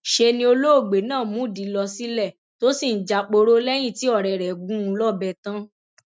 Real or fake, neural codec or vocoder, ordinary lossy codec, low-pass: real; none; none; none